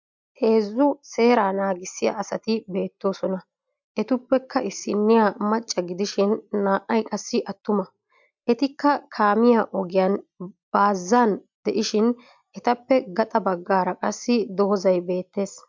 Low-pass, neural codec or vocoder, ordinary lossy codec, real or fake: 7.2 kHz; none; MP3, 64 kbps; real